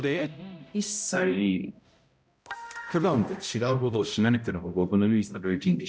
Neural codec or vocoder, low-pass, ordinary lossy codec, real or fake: codec, 16 kHz, 0.5 kbps, X-Codec, HuBERT features, trained on balanced general audio; none; none; fake